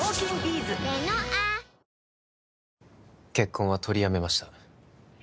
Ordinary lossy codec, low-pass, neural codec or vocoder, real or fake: none; none; none; real